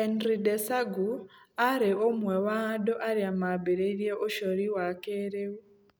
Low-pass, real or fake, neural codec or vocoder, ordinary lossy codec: none; real; none; none